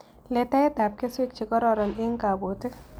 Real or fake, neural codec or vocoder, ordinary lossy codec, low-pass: real; none; none; none